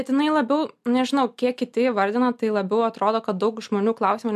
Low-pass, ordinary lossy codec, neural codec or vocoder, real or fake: 14.4 kHz; MP3, 96 kbps; none; real